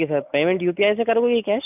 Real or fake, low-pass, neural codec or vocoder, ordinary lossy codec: real; 3.6 kHz; none; none